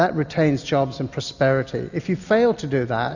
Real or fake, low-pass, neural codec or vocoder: real; 7.2 kHz; none